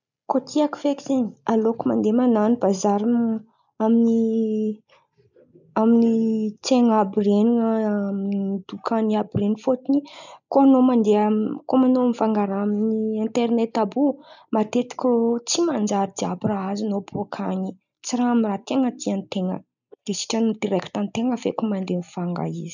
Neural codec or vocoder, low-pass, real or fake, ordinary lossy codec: none; 7.2 kHz; real; none